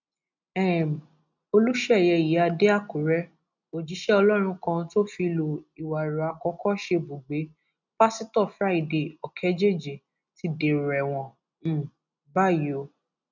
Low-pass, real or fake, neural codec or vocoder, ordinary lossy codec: 7.2 kHz; real; none; none